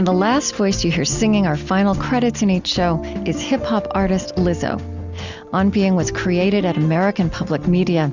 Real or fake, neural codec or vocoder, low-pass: real; none; 7.2 kHz